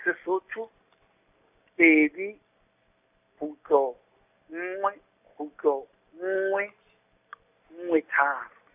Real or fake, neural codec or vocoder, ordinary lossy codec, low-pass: real; none; none; 3.6 kHz